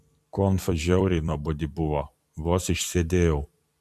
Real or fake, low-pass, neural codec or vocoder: fake; 14.4 kHz; vocoder, 44.1 kHz, 128 mel bands every 256 samples, BigVGAN v2